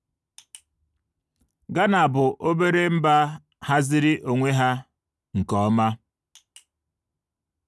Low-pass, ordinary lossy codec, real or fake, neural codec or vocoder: none; none; real; none